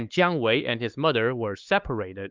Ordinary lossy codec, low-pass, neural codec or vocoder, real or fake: Opus, 32 kbps; 7.2 kHz; codec, 16 kHz, 4 kbps, X-Codec, HuBERT features, trained on LibriSpeech; fake